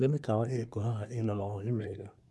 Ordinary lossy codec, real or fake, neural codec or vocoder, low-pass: none; fake; codec, 24 kHz, 1 kbps, SNAC; none